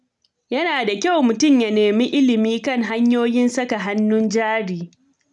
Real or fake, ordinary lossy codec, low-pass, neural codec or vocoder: real; none; 10.8 kHz; none